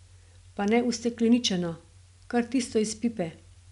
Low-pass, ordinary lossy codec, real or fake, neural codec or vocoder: 10.8 kHz; none; real; none